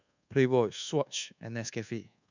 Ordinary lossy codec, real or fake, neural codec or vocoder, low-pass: none; fake; codec, 24 kHz, 1.2 kbps, DualCodec; 7.2 kHz